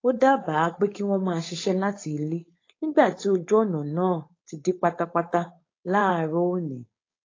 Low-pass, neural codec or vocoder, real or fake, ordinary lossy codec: 7.2 kHz; codec, 16 kHz, 4.8 kbps, FACodec; fake; AAC, 32 kbps